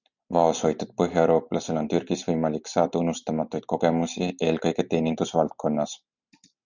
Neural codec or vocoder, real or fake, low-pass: none; real; 7.2 kHz